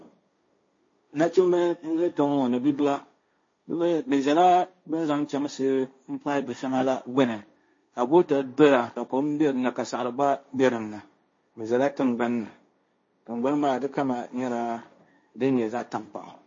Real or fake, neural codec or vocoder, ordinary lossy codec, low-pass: fake; codec, 16 kHz, 1.1 kbps, Voila-Tokenizer; MP3, 32 kbps; 7.2 kHz